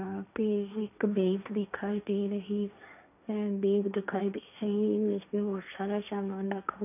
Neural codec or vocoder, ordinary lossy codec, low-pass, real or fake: codec, 16 kHz, 1.1 kbps, Voila-Tokenizer; none; 3.6 kHz; fake